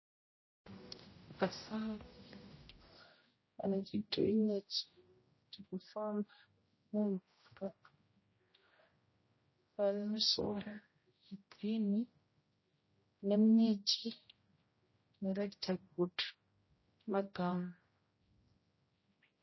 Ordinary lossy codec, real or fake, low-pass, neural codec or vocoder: MP3, 24 kbps; fake; 7.2 kHz; codec, 16 kHz, 0.5 kbps, X-Codec, HuBERT features, trained on general audio